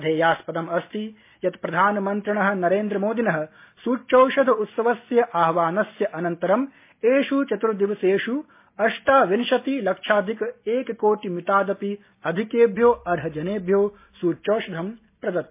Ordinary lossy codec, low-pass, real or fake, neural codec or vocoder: MP3, 24 kbps; 3.6 kHz; real; none